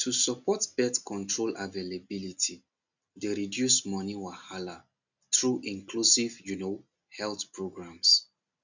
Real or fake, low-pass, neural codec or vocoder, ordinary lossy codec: real; 7.2 kHz; none; none